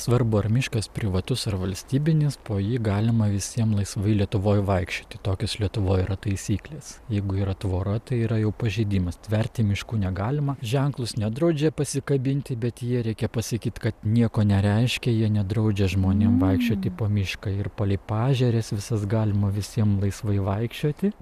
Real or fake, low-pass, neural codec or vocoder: real; 14.4 kHz; none